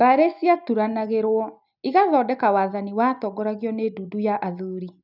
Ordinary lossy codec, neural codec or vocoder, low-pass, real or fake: none; none; 5.4 kHz; real